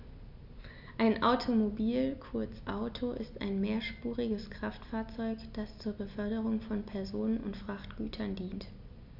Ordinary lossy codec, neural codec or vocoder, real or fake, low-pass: none; none; real; 5.4 kHz